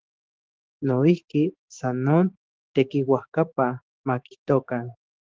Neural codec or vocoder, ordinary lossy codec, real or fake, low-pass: codec, 16 kHz, 6 kbps, DAC; Opus, 16 kbps; fake; 7.2 kHz